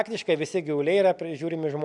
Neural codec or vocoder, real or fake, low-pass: none; real; 10.8 kHz